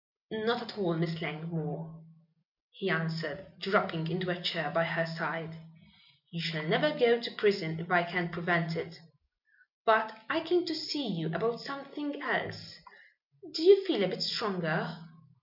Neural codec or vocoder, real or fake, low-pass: none; real; 5.4 kHz